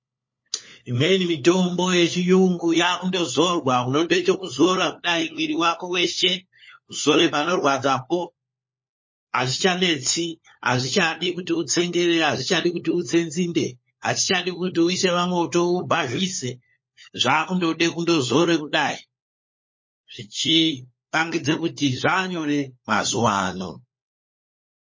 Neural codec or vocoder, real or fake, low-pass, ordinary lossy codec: codec, 16 kHz, 4 kbps, FunCodec, trained on LibriTTS, 50 frames a second; fake; 7.2 kHz; MP3, 32 kbps